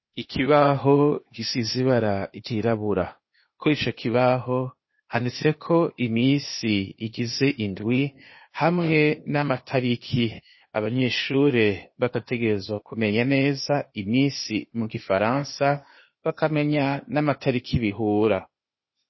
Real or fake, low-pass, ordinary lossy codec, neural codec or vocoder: fake; 7.2 kHz; MP3, 24 kbps; codec, 16 kHz, 0.8 kbps, ZipCodec